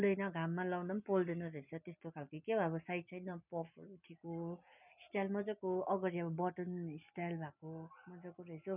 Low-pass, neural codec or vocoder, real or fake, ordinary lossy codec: 3.6 kHz; none; real; none